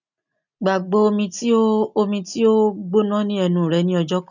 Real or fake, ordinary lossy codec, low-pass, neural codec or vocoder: real; none; 7.2 kHz; none